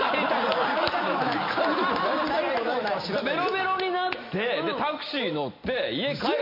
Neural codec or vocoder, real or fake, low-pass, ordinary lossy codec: none; real; 5.4 kHz; MP3, 32 kbps